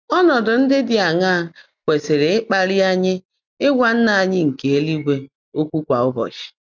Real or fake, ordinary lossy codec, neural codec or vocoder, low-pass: real; none; none; 7.2 kHz